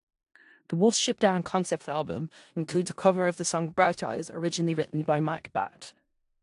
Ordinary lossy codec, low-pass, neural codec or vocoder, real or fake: AAC, 64 kbps; 10.8 kHz; codec, 16 kHz in and 24 kHz out, 0.4 kbps, LongCat-Audio-Codec, four codebook decoder; fake